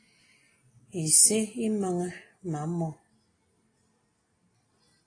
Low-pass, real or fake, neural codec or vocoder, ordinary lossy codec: 9.9 kHz; real; none; AAC, 32 kbps